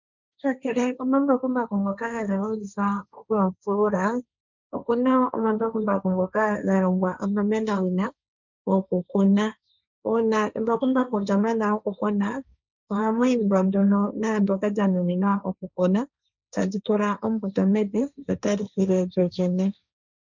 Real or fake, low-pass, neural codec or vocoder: fake; 7.2 kHz; codec, 16 kHz, 1.1 kbps, Voila-Tokenizer